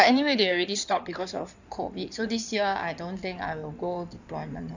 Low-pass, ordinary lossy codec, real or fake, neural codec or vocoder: 7.2 kHz; none; fake; codec, 16 kHz in and 24 kHz out, 2.2 kbps, FireRedTTS-2 codec